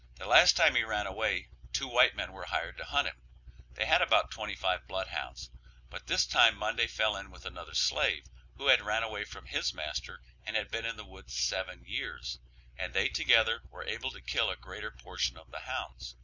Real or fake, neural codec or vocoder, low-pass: real; none; 7.2 kHz